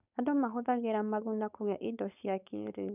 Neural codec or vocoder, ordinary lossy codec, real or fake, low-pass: codec, 16 kHz, 4.8 kbps, FACodec; none; fake; 3.6 kHz